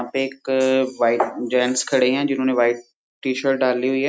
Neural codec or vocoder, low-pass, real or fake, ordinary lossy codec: none; none; real; none